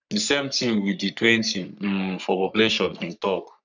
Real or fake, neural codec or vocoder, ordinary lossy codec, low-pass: fake; codec, 44.1 kHz, 3.4 kbps, Pupu-Codec; none; 7.2 kHz